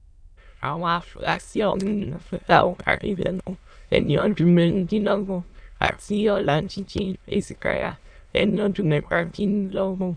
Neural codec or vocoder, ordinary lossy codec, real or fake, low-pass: autoencoder, 22.05 kHz, a latent of 192 numbers a frame, VITS, trained on many speakers; none; fake; 9.9 kHz